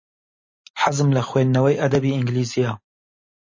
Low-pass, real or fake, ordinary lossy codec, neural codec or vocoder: 7.2 kHz; fake; MP3, 32 kbps; vocoder, 44.1 kHz, 128 mel bands every 256 samples, BigVGAN v2